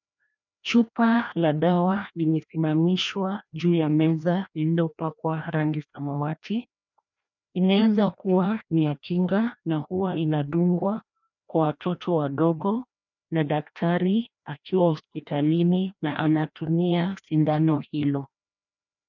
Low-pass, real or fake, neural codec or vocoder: 7.2 kHz; fake; codec, 16 kHz, 1 kbps, FreqCodec, larger model